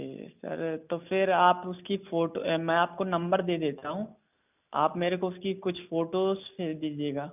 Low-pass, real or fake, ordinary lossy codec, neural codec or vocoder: 3.6 kHz; real; none; none